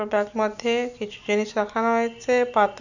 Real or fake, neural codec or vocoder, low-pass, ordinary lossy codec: real; none; 7.2 kHz; none